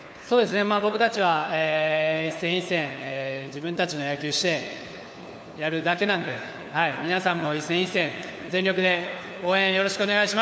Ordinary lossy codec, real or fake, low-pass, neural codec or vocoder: none; fake; none; codec, 16 kHz, 4 kbps, FunCodec, trained on LibriTTS, 50 frames a second